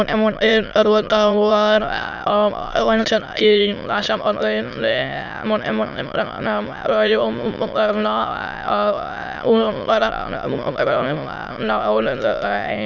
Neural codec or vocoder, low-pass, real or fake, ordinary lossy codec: autoencoder, 22.05 kHz, a latent of 192 numbers a frame, VITS, trained on many speakers; 7.2 kHz; fake; none